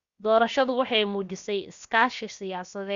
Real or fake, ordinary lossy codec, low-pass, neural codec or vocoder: fake; Opus, 64 kbps; 7.2 kHz; codec, 16 kHz, about 1 kbps, DyCAST, with the encoder's durations